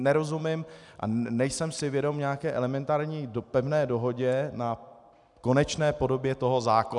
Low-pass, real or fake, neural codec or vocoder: 10.8 kHz; real; none